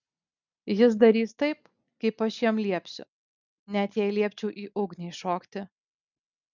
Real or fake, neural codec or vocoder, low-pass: real; none; 7.2 kHz